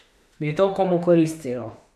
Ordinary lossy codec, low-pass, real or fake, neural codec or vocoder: none; 14.4 kHz; fake; autoencoder, 48 kHz, 32 numbers a frame, DAC-VAE, trained on Japanese speech